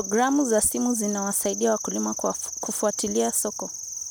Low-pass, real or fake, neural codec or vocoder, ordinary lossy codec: none; real; none; none